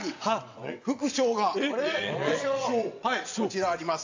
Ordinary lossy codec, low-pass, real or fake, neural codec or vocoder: AAC, 48 kbps; 7.2 kHz; fake; vocoder, 22.05 kHz, 80 mel bands, WaveNeXt